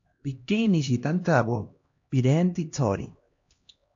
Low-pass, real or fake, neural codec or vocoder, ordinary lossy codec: 7.2 kHz; fake; codec, 16 kHz, 1 kbps, X-Codec, HuBERT features, trained on LibriSpeech; AAC, 48 kbps